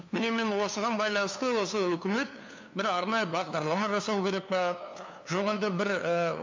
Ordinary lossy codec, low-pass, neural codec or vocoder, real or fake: MP3, 48 kbps; 7.2 kHz; codec, 16 kHz, 2 kbps, FunCodec, trained on LibriTTS, 25 frames a second; fake